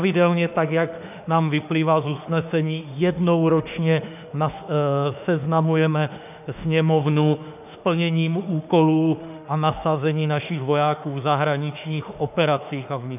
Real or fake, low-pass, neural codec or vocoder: fake; 3.6 kHz; autoencoder, 48 kHz, 32 numbers a frame, DAC-VAE, trained on Japanese speech